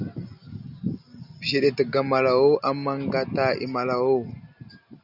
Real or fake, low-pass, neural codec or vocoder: real; 5.4 kHz; none